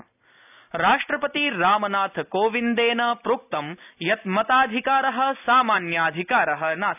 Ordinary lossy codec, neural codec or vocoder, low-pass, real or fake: none; none; 3.6 kHz; real